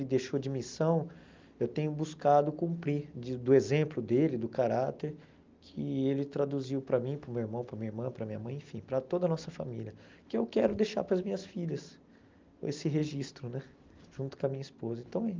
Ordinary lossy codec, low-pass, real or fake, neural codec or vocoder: Opus, 24 kbps; 7.2 kHz; real; none